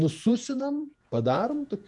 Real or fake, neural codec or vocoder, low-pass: fake; vocoder, 48 kHz, 128 mel bands, Vocos; 10.8 kHz